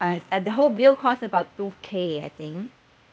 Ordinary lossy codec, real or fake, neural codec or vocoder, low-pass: none; fake; codec, 16 kHz, 0.8 kbps, ZipCodec; none